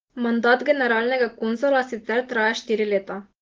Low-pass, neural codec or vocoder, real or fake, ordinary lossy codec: 7.2 kHz; none; real; Opus, 16 kbps